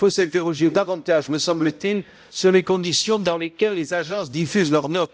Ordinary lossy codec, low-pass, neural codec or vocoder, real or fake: none; none; codec, 16 kHz, 0.5 kbps, X-Codec, HuBERT features, trained on balanced general audio; fake